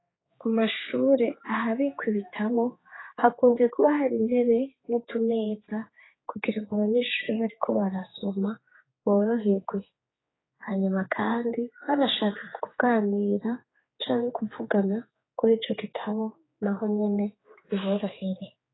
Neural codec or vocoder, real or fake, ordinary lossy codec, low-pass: codec, 16 kHz, 4 kbps, X-Codec, HuBERT features, trained on general audio; fake; AAC, 16 kbps; 7.2 kHz